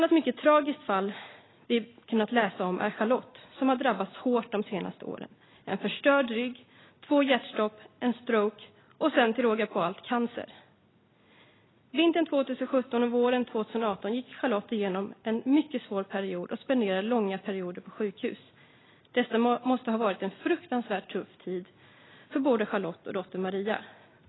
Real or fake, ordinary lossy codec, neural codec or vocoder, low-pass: real; AAC, 16 kbps; none; 7.2 kHz